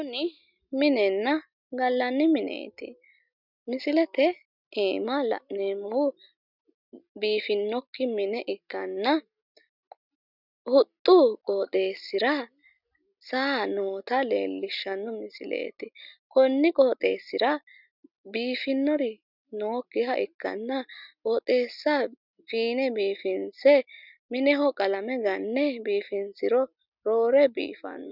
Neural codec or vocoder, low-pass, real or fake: none; 5.4 kHz; real